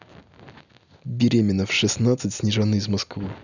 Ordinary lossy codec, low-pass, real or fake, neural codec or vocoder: none; 7.2 kHz; real; none